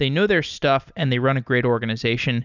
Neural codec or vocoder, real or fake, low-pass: none; real; 7.2 kHz